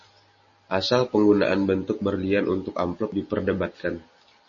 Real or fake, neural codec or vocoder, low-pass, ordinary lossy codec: real; none; 7.2 kHz; MP3, 32 kbps